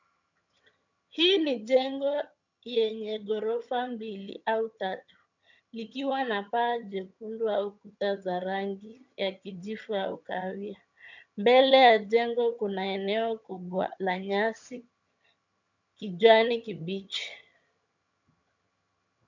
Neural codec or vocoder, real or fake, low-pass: vocoder, 22.05 kHz, 80 mel bands, HiFi-GAN; fake; 7.2 kHz